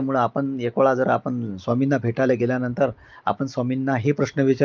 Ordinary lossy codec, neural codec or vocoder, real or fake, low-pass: Opus, 24 kbps; none; real; 7.2 kHz